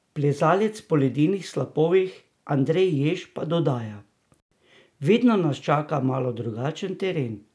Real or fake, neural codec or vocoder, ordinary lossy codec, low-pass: real; none; none; none